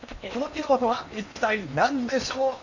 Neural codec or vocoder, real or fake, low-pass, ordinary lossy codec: codec, 16 kHz in and 24 kHz out, 0.8 kbps, FocalCodec, streaming, 65536 codes; fake; 7.2 kHz; none